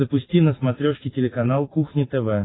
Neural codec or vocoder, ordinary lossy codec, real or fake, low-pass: none; AAC, 16 kbps; real; 7.2 kHz